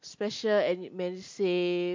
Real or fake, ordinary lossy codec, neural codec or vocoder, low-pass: real; MP3, 48 kbps; none; 7.2 kHz